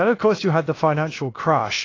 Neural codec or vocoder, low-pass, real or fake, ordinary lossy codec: codec, 16 kHz, about 1 kbps, DyCAST, with the encoder's durations; 7.2 kHz; fake; AAC, 32 kbps